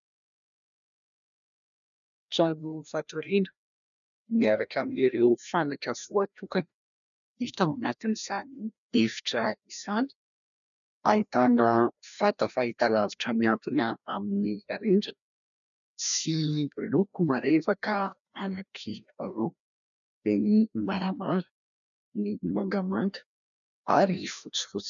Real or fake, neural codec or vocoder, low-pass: fake; codec, 16 kHz, 1 kbps, FreqCodec, larger model; 7.2 kHz